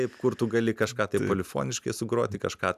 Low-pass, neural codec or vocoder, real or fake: 14.4 kHz; none; real